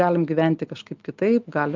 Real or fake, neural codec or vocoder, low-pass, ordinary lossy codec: real; none; 7.2 kHz; Opus, 32 kbps